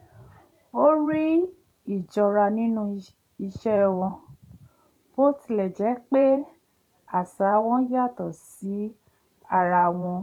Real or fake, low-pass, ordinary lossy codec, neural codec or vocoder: fake; 19.8 kHz; none; vocoder, 44.1 kHz, 128 mel bands every 512 samples, BigVGAN v2